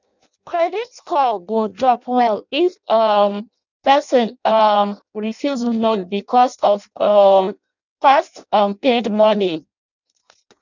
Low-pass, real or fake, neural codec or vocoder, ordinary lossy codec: 7.2 kHz; fake; codec, 16 kHz in and 24 kHz out, 0.6 kbps, FireRedTTS-2 codec; none